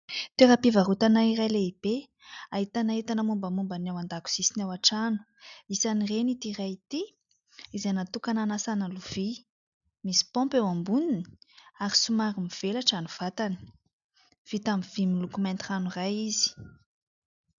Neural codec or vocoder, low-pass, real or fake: none; 7.2 kHz; real